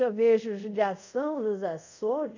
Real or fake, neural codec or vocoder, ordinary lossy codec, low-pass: fake; codec, 24 kHz, 0.5 kbps, DualCodec; none; 7.2 kHz